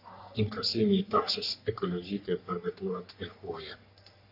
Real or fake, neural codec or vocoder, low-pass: fake; codec, 44.1 kHz, 3.4 kbps, Pupu-Codec; 5.4 kHz